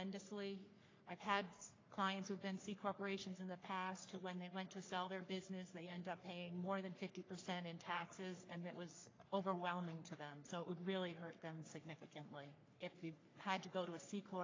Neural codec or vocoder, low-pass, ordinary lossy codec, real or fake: codec, 44.1 kHz, 3.4 kbps, Pupu-Codec; 7.2 kHz; AAC, 32 kbps; fake